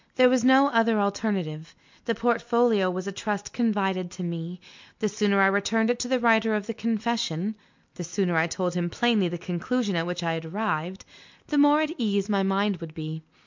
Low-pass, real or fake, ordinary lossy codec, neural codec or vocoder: 7.2 kHz; real; MP3, 64 kbps; none